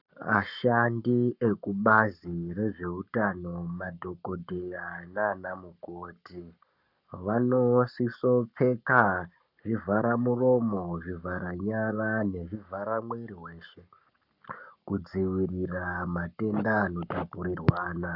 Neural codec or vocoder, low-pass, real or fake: codec, 44.1 kHz, 7.8 kbps, Pupu-Codec; 5.4 kHz; fake